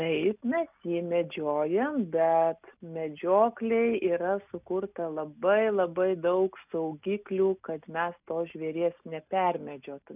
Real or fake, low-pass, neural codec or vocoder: real; 3.6 kHz; none